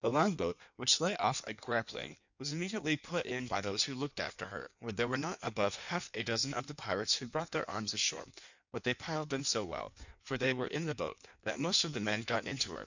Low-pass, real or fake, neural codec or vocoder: 7.2 kHz; fake; codec, 16 kHz in and 24 kHz out, 1.1 kbps, FireRedTTS-2 codec